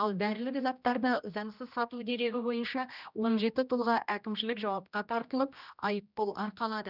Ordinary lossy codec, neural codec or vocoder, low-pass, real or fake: none; codec, 16 kHz, 1 kbps, X-Codec, HuBERT features, trained on general audio; 5.4 kHz; fake